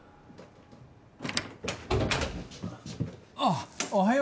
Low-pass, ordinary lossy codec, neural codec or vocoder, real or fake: none; none; none; real